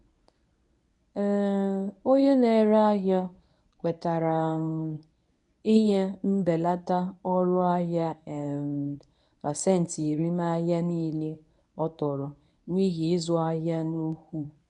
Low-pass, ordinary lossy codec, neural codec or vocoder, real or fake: 10.8 kHz; none; codec, 24 kHz, 0.9 kbps, WavTokenizer, medium speech release version 1; fake